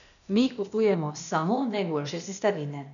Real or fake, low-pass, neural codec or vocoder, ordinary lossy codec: fake; 7.2 kHz; codec, 16 kHz, 0.8 kbps, ZipCodec; none